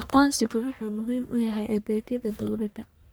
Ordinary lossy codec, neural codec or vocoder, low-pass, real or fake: none; codec, 44.1 kHz, 1.7 kbps, Pupu-Codec; none; fake